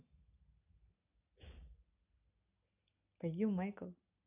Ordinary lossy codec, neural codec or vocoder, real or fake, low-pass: none; none; real; 3.6 kHz